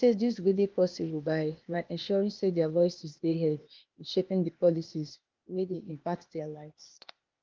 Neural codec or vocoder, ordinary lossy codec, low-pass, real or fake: codec, 16 kHz, 0.8 kbps, ZipCodec; Opus, 24 kbps; 7.2 kHz; fake